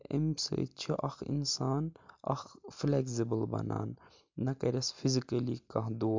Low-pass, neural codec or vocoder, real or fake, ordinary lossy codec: 7.2 kHz; none; real; MP3, 64 kbps